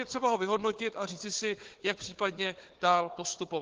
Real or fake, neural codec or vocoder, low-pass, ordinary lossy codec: fake; codec, 16 kHz, 4 kbps, FunCodec, trained on Chinese and English, 50 frames a second; 7.2 kHz; Opus, 16 kbps